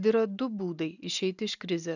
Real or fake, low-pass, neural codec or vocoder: real; 7.2 kHz; none